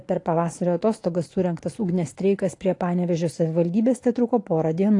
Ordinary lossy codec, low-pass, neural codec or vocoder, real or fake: AAC, 48 kbps; 10.8 kHz; none; real